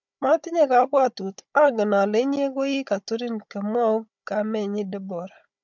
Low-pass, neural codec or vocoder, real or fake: 7.2 kHz; codec, 16 kHz, 16 kbps, FunCodec, trained on Chinese and English, 50 frames a second; fake